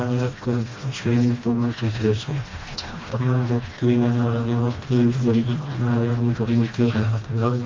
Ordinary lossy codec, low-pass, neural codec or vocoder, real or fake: Opus, 32 kbps; 7.2 kHz; codec, 16 kHz, 1 kbps, FreqCodec, smaller model; fake